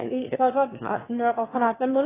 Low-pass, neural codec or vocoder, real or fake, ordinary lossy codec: 3.6 kHz; autoencoder, 22.05 kHz, a latent of 192 numbers a frame, VITS, trained on one speaker; fake; AAC, 16 kbps